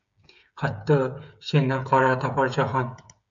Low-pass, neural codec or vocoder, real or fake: 7.2 kHz; codec, 16 kHz, 8 kbps, FreqCodec, smaller model; fake